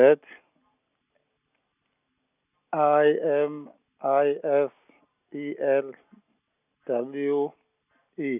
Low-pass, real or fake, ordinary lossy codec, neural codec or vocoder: 3.6 kHz; real; none; none